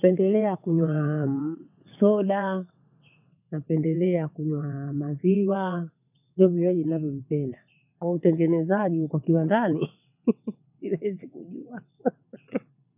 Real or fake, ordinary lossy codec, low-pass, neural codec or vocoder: fake; none; 3.6 kHz; vocoder, 22.05 kHz, 80 mel bands, WaveNeXt